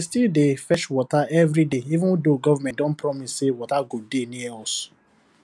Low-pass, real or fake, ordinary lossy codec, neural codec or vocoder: none; real; none; none